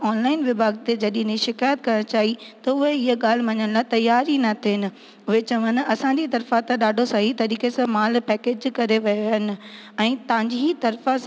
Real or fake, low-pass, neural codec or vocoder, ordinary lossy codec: real; none; none; none